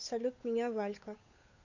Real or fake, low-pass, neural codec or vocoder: fake; 7.2 kHz; codec, 16 kHz, 8 kbps, FunCodec, trained on Chinese and English, 25 frames a second